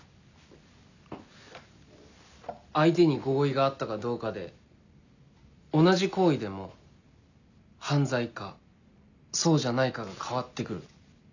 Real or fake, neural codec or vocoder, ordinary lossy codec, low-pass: real; none; none; 7.2 kHz